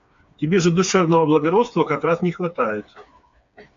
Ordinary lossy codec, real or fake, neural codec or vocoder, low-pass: AAC, 64 kbps; fake; codec, 16 kHz, 4 kbps, FreqCodec, smaller model; 7.2 kHz